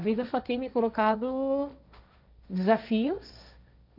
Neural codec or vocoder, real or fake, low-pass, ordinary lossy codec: codec, 16 kHz, 1.1 kbps, Voila-Tokenizer; fake; 5.4 kHz; none